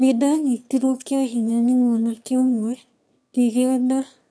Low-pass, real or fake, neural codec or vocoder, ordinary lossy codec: none; fake; autoencoder, 22.05 kHz, a latent of 192 numbers a frame, VITS, trained on one speaker; none